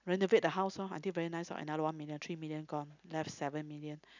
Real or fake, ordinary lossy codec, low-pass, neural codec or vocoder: real; none; 7.2 kHz; none